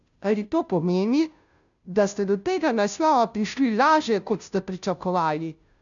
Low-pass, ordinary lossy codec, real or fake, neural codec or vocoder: 7.2 kHz; none; fake; codec, 16 kHz, 0.5 kbps, FunCodec, trained on Chinese and English, 25 frames a second